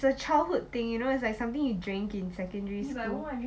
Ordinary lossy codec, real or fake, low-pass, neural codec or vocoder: none; real; none; none